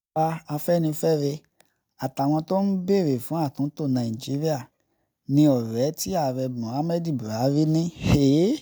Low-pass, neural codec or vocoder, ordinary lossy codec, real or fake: none; none; none; real